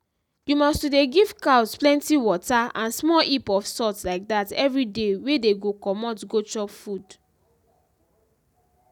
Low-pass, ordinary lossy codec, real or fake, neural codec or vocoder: 19.8 kHz; none; real; none